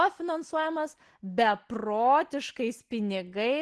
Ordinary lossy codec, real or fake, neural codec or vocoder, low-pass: Opus, 16 kbps; real; none; 10.8 kHz